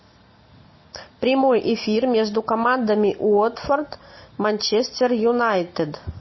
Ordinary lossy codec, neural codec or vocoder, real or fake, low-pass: MP3, 24 kbps; none; real; 7.2 kHz